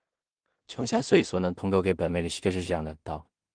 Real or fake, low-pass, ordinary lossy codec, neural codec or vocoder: fake; 9.9 kHz; Opus, 24 kbps; codec, 16 kHz in and 24 kHz out, 0.4 kbps, LongCat-Audio-Codec, two codebook decoder